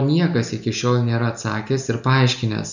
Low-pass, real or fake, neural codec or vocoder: 7.2 kHz; real; none